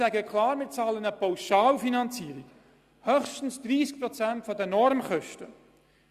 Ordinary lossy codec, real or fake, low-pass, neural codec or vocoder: Opus, 64 kbps; real; 14.4 kHz; none